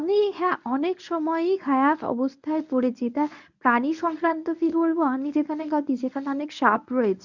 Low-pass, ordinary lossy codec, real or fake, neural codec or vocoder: 7.2 kHz; none; fake; codec, 24 kHz, 0.9 kbps, WavTokenizer, medium speech release version 1